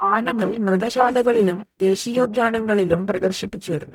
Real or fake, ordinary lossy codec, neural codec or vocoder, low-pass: fake; none; codec, 44.1 kHz, 0.9 kbps, DAC; 19.8 kHz